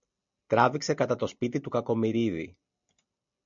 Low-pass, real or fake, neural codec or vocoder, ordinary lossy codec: 7.2 kHz; real; none; MP3, 64 kbps